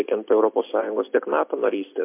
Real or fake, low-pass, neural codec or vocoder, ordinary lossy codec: real; 3.6 kHz; none; MP3, 32 kbps